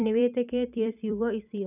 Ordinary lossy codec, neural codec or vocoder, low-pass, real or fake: none; vocoder, 44.1 kHz, 128 mel bands every 256 samples, BigVGAN v2; 3.6 kHz; fake